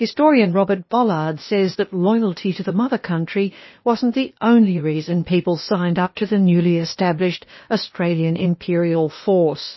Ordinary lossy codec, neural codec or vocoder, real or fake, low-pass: MP3, 24 kbps; codec, 16 kHz, 0.8 kbps, ZipCodec; fake; 7.2 kHz